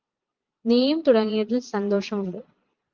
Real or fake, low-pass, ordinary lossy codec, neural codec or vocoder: fake; 7.2 kHz; Opus, 32 kbps; vocoder, 22.05 kHz, 80 mel bands, Vocos